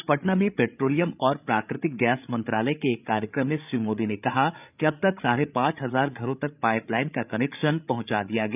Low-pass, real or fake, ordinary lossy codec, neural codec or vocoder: 3.6 kHz; fake; none; codec, 16 kHz, 8 kbps, FreqCodec, larger model